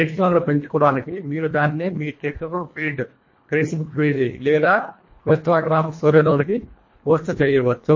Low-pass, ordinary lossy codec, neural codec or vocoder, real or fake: 7.2 kHz; MP3, 32 kbps; codec, 24 kHz, 1.5 kbps, HILCodec; fake